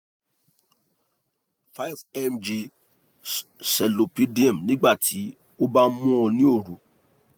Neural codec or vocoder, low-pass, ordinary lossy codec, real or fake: none; none; none; real